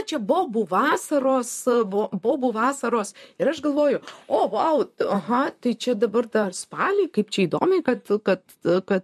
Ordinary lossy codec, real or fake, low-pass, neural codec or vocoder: MP3, 64 kbps; fake; 14.4 kHz; vocoder, 44.1 kHz, 128 mel bands, Pupu-Vocoder